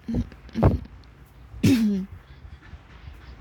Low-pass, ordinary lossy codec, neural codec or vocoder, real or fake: 19.8 kHz; none; codec, 44.1 kHz, 7.8 kbps, Pupu-Codec; fake